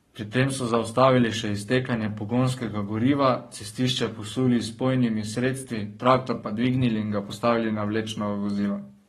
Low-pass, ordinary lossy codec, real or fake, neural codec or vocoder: 19.8 kHz; AAC, 32 kbps; fake; codec, 44.1 kHz, 7.8 kbps, Pupu-Codec